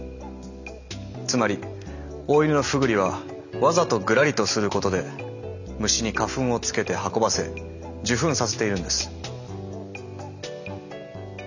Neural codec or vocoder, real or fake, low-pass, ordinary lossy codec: none; real; 7.2 kHz; none